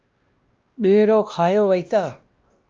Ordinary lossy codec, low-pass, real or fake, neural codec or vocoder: Opus, 24 kbps; 7.2 kHz; fake; codec, 16 kHz, 1 kbps, X-Codec, WavLM features, trained on Multilingual LibriSpeech